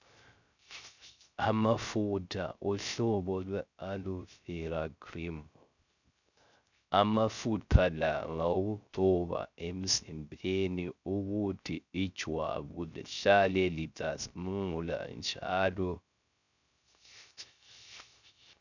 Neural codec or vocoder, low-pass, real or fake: codec, 16 kHz, 0.3 kbps, FocalCodec; 7.2 kHz; fake